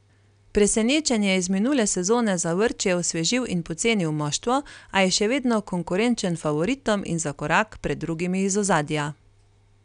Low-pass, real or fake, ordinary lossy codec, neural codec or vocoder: 9.9 kHz; real; none; none